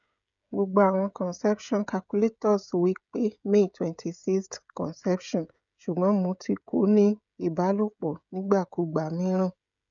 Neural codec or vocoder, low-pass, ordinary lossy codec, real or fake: codec, 16 kHz, 16 kbps, FreqCodec, smaller model; 7.2 kHz; none; fake